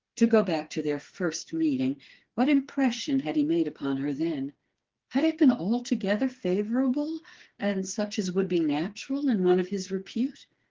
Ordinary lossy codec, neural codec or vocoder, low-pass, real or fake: Opus, 16 kbps; codec, 16 kHz, 4 kbps, FreqCodec, smaller model; 7.2 kHz; fake